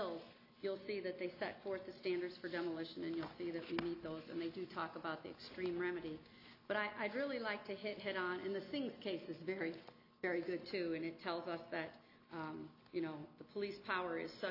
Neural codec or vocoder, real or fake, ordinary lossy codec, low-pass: none; real; AAC, 48 kbps; 5.4 kHz